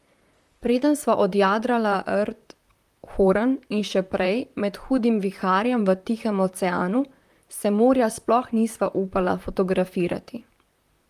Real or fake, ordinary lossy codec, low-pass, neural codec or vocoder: fake; Opus, 32 kbps; 14.4 kHz; vocoder, 44.1 kHz, 128 mel bands, Pupu-Vocoder